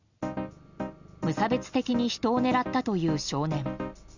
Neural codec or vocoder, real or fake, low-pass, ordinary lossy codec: none; real; 7.2 kHz; none